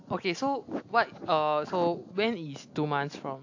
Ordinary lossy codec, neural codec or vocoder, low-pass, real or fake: AAC, 48 kbps; none; 7.2 kHz; real